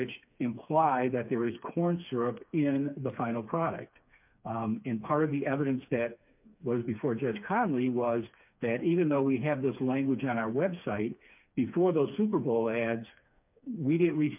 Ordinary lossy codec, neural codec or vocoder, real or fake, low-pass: MP3, 32 kbps; codec, 16 kHz, 4 kbps, FreqCodec, smaller model; fake; 3.6 kHz